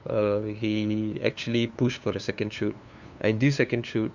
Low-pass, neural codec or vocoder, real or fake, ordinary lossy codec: 7.2 kHz; codec, 16 kHz, 2 kbps, FunCodec, trained on LibriTTS, 25 frames a second; fake; none